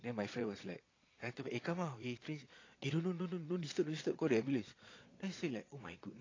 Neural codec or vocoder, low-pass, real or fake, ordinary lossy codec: vocoder, 22.05 kHz, 80 mel bands, Vocos; 7.2 kHz; fake; AAC, 32 kbps